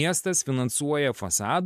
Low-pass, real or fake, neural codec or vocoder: 14.4 kHz; real; none